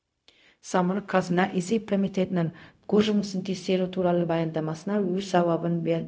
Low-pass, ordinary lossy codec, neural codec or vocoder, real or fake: none; none; codec, 16 kHz, 0.4 kbps, LongCat-Audio-Codec; fake